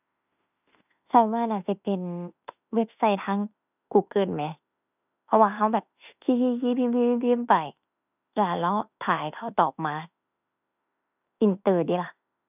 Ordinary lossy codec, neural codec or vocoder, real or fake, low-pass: none; autoencoder, 48 kHz, 32 numbers a frame, DAC-VAE, trained on Japanese speech; fake; 3.6 kHz